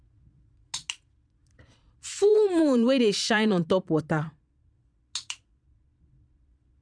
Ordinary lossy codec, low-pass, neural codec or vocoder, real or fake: none; 9.9 kHz; vocoder, 22.05 kHz, 80 mel bands, Vocos; fake